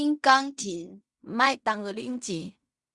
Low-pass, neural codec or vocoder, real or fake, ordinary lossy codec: 10.8 kHz; codec, 16 kHz in and 24 kHz out, 0.4 kbps, LongCat-Audio-Codec, fine tuned four codebook decoder; fake; Opus, 64 kbps